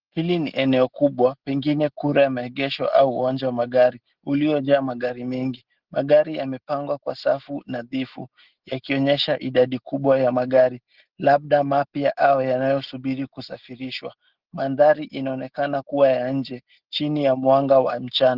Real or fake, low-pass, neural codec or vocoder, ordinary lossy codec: real; 5.4 kHz; none; Opus, 16 kbps